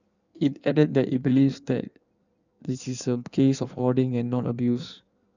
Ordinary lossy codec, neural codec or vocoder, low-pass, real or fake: none; codec, 16 kHz in and 24 kHz out, 1.1 kbps, FireRedTTS-2 codec; 7.2 kHz; fake